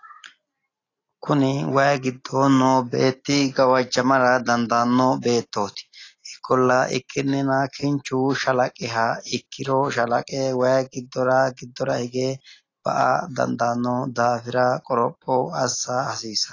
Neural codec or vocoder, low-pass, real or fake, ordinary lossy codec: none; 7.2 kHz; real; AAC, 32 kbps